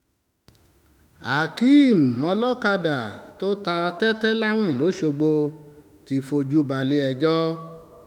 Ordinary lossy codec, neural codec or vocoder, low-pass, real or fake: none; autoencoder, 48 kHz, 32 numbers a frame, DAC-VAE, trained on Japanese speech; 19.8 kHz; fake